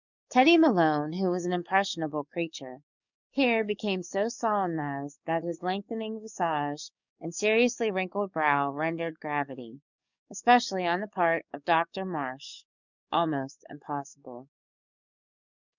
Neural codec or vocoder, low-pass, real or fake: codec, 44.1 kHz, 7.8 kbps, DAC; 7.2 kHz; fake